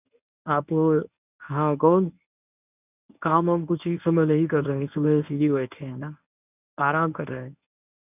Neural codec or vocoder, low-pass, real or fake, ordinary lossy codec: codec, 24 kHz, 0.9 kbps, WavTokenizer, medium speech release version 1; 3.6 kHz; fake; none